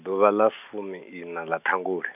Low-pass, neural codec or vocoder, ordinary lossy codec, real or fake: 3.6 kHz; none; none; real